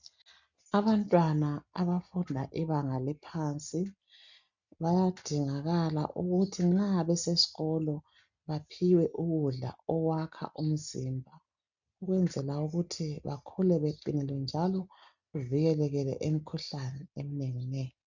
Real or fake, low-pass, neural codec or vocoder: real; 7.2 kHz; none